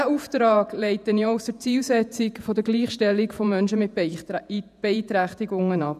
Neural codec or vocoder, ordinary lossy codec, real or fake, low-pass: vocoder, 48 kHz, 128 mel bands, Vocos; none; fake; 14.4 kHz